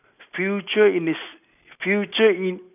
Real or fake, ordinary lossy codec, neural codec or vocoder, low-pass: real; none; none; 3.6 kHz